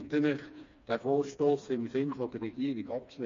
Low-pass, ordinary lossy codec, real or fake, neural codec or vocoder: 7.2 kHz; MP3, 48 kbps; fake; codec, 16 kHz, 2 kbps, FreqCodec, smaller model